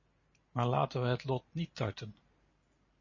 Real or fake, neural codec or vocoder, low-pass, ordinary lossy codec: real; none; 7.2 kHz; MP3, 32 kbps